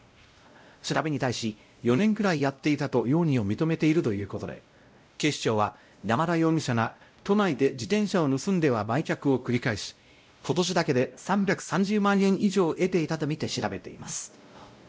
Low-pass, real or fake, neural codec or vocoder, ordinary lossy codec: none; fake; codec, 16 kHz, 0.5 kbps, X-Codec, WavLM features, trained on Multilingual LibriSpeech; none